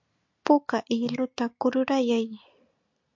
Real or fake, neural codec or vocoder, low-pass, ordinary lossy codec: real; none; 7.2 kHz; MP3, 48 kbps